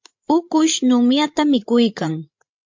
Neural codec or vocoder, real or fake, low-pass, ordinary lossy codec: codec, 16 kHz, 16 kbps, FreqCodec, larger model; fake; 7.2 kHz; MP3, 48 kbps